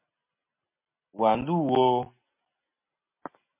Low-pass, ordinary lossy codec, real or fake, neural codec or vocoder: 3.6 kHz; MP3, 32 kbps; real; none